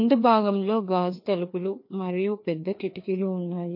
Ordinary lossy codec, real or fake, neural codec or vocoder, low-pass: MP3, 32 kbps; fake; codec, 16 kHz, 2 kbps, FreqCodec, larger model; 5.4 kHz